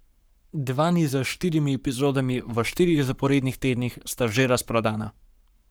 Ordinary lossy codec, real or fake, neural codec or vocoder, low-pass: none; fake; codec, 44.1 kHz, 7.8 kbps, Pupu-Codec; none